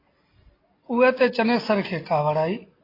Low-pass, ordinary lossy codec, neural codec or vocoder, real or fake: 5.4 kHz; AAC, 24 kbps; none; real